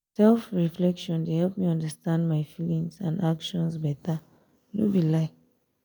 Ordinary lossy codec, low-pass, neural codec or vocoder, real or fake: none; none; none; real